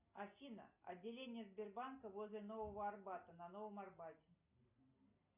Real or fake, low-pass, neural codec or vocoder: real; 3.6 kHz; none